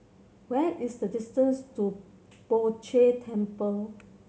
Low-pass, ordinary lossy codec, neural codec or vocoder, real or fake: none; none; none; real